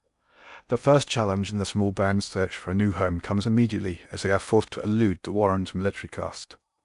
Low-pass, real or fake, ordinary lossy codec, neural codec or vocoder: 10.8 kHz; fake; none; codec, 16 kHz in and 24 kHz out, 0.6 kbps, FocalCodec, streaming, 2048 codes